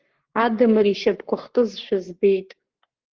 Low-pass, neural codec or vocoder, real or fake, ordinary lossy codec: 7.2 kHz; codec, 44.1 kHz, 7.8 kbps, Pupu-Codec; fake; Opus, 16 kbps